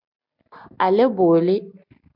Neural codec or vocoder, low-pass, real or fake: none; 5.4 kHz; real